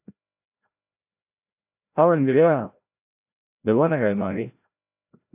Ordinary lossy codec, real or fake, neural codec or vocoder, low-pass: AAC, 32 kbps; fake; codec, 16 kHz, 0.5 kbps, FreqCodec, larger model; 3.6 kHz